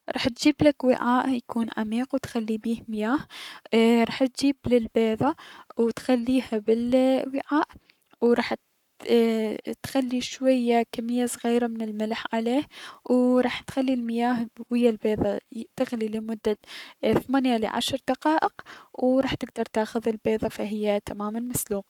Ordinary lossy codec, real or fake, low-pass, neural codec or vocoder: none; fake; 19.8 kHz; codec, 44.1 kHz, 7.8 kbps, Pupu-Codec